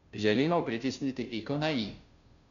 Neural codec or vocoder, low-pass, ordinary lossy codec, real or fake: codec, 16 kHz, 0.5 kbps, FunCodec, trained on Chinese and English, 25 frames a second; 7.2 kHz; none; fake